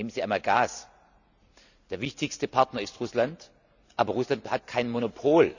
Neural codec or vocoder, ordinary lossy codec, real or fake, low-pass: none; none; real; 7.2 kHz